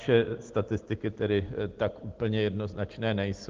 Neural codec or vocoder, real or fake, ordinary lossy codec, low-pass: none; real; Opus, 16 kbps; 7.2 kHz